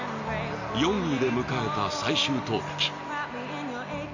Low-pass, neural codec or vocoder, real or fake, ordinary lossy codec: 7.2 kHz; none; real; none